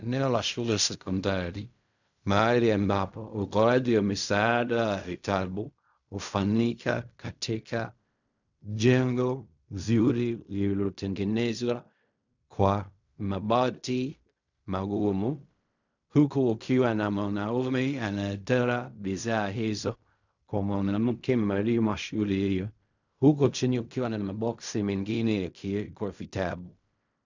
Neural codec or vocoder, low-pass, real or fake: codec, 16 kHz in and 24 kHz out, 0.4 kbps, LongCat-Audio-Codec, fine tuned four codebook decoder; 7.2 kHz; fake